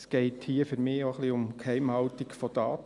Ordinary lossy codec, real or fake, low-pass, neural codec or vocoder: none; real; 10.8 kHz; none